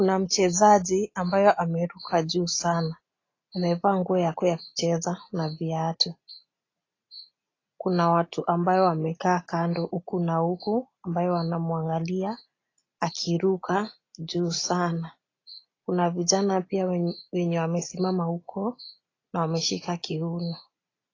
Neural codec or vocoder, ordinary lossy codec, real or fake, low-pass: none; AAC, 32 kbps; real; 7.2 kHz